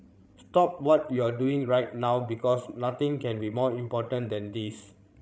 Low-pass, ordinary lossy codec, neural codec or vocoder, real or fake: none; none; codec, 16 kHz, 16 kbps, FreqCodec, larger model; fake